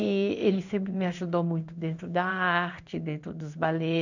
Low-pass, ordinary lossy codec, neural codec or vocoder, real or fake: 7.2 kHz; none; codec, 16 kHz in and 24 kHz out, 1 kbps, XY-Tokenizer; fake